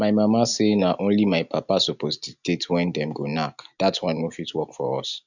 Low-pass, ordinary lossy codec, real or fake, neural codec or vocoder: 7.2 kHz; none; real; none